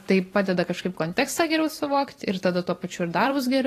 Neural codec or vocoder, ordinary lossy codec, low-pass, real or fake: vocoder, 44.1 kHz, 128 mel bands every 512 samples, BigVGAN v2; AAC, 48 kbps; 14.4 kHz; fake